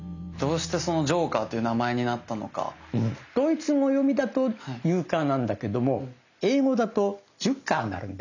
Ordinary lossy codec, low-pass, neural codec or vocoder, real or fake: none; 7.2 kHz; none; real